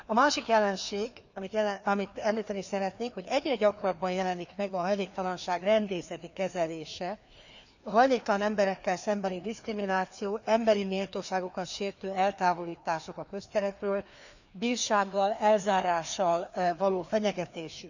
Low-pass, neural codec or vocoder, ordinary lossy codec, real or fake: 7.2 kHz; codec, 16 kHz, 2 kbps, FreqCodec, larger model; none; fake